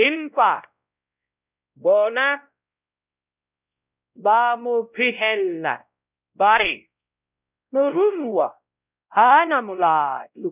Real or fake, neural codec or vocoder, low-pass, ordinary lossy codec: fake; codec, 16 kHz, 0.5 kbps, X-Codec, WavLM features, trained on Multilingual LibriSpeech; 3.6 kHz; none